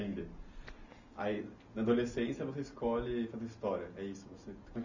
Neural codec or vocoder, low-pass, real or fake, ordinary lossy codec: none; 7.2 kHz; real; none